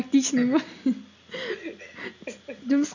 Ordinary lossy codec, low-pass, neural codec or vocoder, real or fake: none; 7.2 kHz; none; real